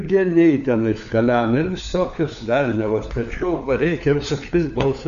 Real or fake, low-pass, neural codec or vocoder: fake; 7.2 kHz; codec, 16 kHz, 4 kbps, FunCodec, trained on LibriTTS, 50 frames a second